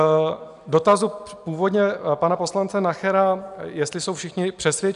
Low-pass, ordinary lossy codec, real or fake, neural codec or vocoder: 10.8 kHz; AAC, 96 kbps; real; none